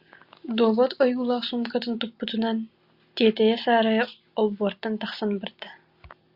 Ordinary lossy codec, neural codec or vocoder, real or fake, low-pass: Opus, 64 kbps; none; real; 5.4 kHz